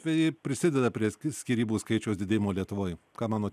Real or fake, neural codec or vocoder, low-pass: real; none; 14.4 kHz